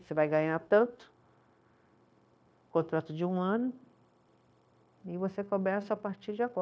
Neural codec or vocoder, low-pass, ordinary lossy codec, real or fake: codec, 16 kHz, 0.9 kbps, LongCat-Audio-Codec; none; none; fake